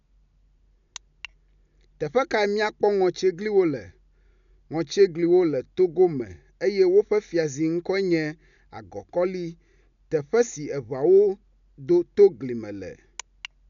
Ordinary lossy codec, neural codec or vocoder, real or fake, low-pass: none; none; real; 7.2 kHz